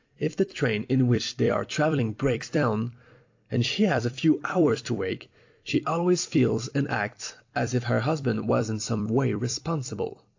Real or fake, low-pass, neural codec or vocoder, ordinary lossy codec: fake; 7.2 kHz; vocoder, 44.1 kHz, 128 mel bands every 256 samples, BigVGAN v2; AAC, 48 kbps